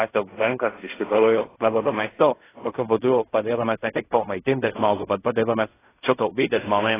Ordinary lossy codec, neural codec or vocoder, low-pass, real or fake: AAC, 16 kbps; codec, 16 kHz in and 24 kHz out, 0.4 kbps, LongCat-Audio-Codec, fine tuned four codebook decoder; 3.6 kHz; fake